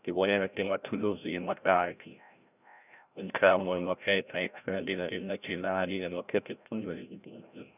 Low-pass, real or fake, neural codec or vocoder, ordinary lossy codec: 3.6 kHz; fake; codec, 16 kHz, 0.5 kbps, FreqCodec, larger model; none